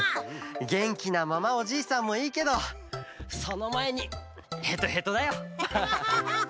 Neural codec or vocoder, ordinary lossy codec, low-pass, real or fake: none; none; none; real